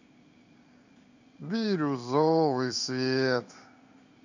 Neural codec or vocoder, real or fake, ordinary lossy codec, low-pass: codec, 16 kHz in and 24 kHz out, 1 kbps, XY-Tokenizer; fake; none; 7.2 kHz